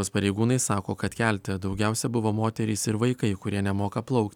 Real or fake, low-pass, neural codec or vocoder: real; 19.8 kHz; none